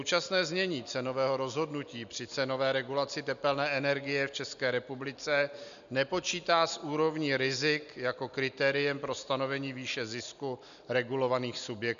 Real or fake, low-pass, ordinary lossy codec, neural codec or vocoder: real; 7.2 kHz; MP3, 96 kbps; none